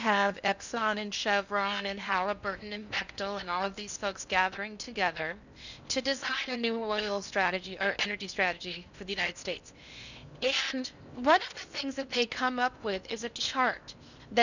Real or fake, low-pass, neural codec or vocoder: fake; 7.2 kHz; codec, 16 kHz in and 24 kHz out, 0.8 kbps, FocalCodec, streaming, 65536 codes